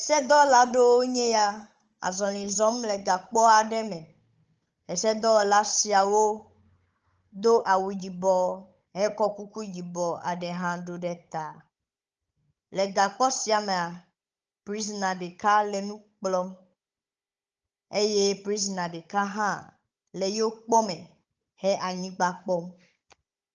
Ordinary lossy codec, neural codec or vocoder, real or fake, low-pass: Opus, 24 kbps; codec, 16 kHz, 16 kbps, FunCodec, trained on Chinese and English, 50 frames a second; fake; 7.2 kHz